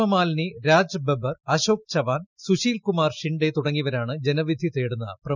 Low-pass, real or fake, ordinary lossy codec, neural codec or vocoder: 7.2 kHz; real; none; none